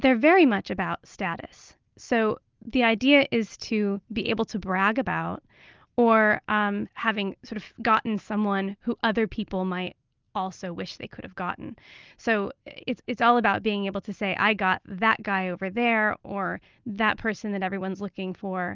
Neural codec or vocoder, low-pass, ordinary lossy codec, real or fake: none; 7.2 kHz; Opus, 24 kbps; real